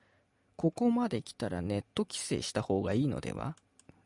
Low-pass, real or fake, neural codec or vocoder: 10.8 kHz; real; none